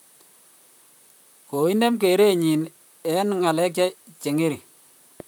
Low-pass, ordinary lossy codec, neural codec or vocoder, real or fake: none; none; vocoder, 44.1 kHz, 128 mel bands, Pupu-Vocoder; fake